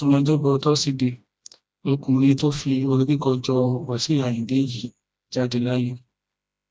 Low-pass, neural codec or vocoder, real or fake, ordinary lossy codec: none; codec, 16 kHz, 1 kbps, FreqCodec, smaller model; fake; none